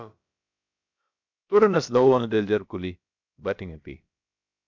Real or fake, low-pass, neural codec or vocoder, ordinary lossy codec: fake; 7.2 kHz; codec, 16 kHz, about 1 kbps, DyCAST, with the encoder's durations; MP3, 64 kbps